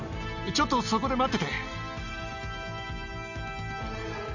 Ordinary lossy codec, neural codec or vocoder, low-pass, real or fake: none; none; 7.2 kHz; real